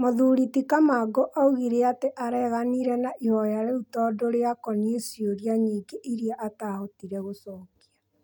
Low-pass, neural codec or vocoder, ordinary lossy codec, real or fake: 19.8 kHz; none; none; real